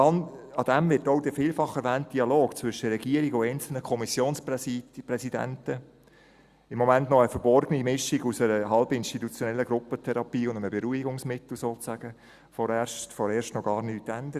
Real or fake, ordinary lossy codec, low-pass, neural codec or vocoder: real; Opus, 64 kbps; 14.4 kHz; none